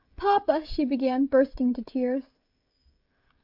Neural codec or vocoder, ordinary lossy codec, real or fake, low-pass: codec, 16 kHz, 16 kbps, FreqCodec, smaller model; AAC, 48 kbps; fake; 5.4 kHz